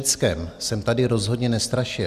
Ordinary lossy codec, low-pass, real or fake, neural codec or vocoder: Opus, 64 kbps; 14.4 kHz; real; none